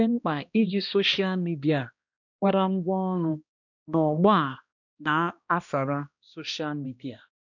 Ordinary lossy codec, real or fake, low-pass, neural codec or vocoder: none; fake; 7.2 kHz; codec, 16 kHz, 1 kbps, X-Codec, HuBERT features, trained on balanced general audio